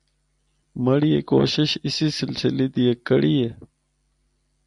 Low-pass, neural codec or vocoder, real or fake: 10.8 kHz; none; real